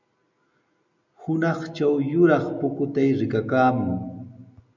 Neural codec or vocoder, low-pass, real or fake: none; 7.2 kHz; real